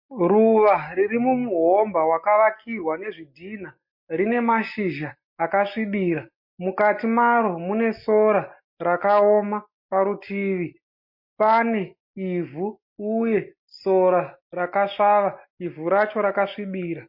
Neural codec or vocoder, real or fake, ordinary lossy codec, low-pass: none; real; MP3, 32 kbps; 5.4 kHz